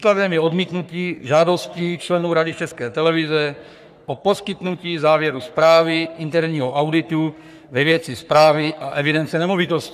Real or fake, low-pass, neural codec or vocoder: fake; 14.4 kHz; codec, 44.1 kHz, 3.4 kbps, Pupu-Codec